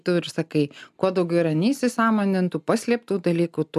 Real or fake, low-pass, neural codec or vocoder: real; 14.4 kHz; none